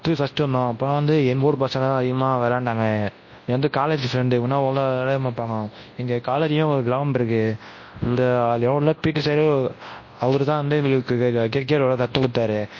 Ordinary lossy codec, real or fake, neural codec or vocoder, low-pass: MP3, 32 kbps; fake; codec, 24 kHz, 0.9 kbps, WavTokenizer, large speech release; 7.2 kHz